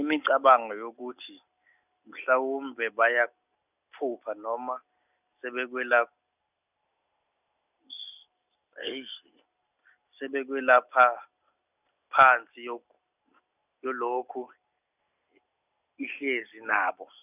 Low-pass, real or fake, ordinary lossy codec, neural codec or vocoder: 3.6 kHz; real; none; none